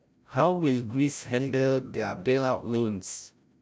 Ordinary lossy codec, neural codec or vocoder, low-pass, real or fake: none; codec, 16 kHz, 0.5 kbps, FreqCodec, larger model; none; fake